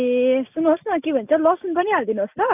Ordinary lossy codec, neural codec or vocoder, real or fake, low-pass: none; none; real; 3.6 kHz